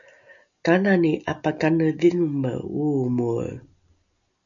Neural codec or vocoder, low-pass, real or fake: none; 7.2 kHz; real